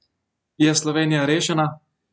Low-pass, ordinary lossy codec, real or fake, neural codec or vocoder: none; none; real; none